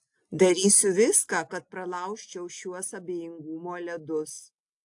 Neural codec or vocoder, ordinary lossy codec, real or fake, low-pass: none; AAC, 64 kbps; real; 10.8 kHz